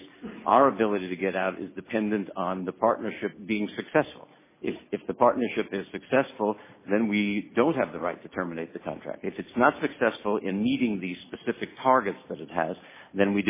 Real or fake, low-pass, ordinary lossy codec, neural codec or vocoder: real; 3.6 kHz; MP3, 16 kbps; none